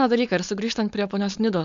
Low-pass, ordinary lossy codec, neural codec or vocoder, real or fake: 7.2 kHz; AAC, 96 kbps; codec, 16 kHz, 4.8 kbps, FACodec; fake